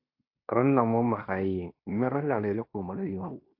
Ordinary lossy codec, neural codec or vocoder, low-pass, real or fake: AAC, 32 kbps; codec, 16 kHz in and 24 kHz out, 0.9 kbps, LongCat-Audio-Codec, fine tuned four codebook decoder; 5.4 kHz; fake